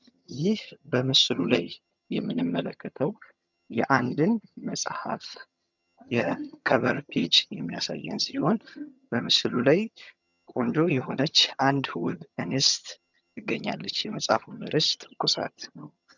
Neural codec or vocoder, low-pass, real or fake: vocoder, 22.05 kHz, 80 mel bands, HiFi-GAN; 7.2 kHz; fake